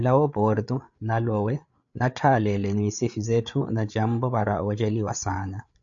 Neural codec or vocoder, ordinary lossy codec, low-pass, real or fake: codec, 16 kHz, 8 kbps, FreqCodec, larger model; AAC, 64 kbps; 7.2 kHz; fake